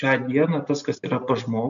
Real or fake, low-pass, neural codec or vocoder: real; 7.2 kHz; none